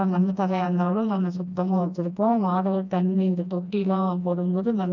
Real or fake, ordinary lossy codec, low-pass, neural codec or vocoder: fake; none; 7.2 kHz; codec, 16 kHz, 1 kbps, FreqCodec, smaller model